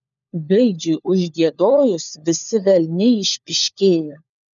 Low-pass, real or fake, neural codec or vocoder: 7.2 kHz; fake; codec, 16 kHz, 4 kbps, FunCodec, trained on LibriTTS, 50 frames a second